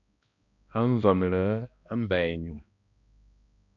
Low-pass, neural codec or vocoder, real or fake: 7.2 kHz; codec, 16 kHz, 1 kbps, X-Codec, HuBERT features, trained on balanced general audio; fake